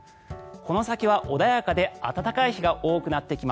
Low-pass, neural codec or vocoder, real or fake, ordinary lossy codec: none; none; real; none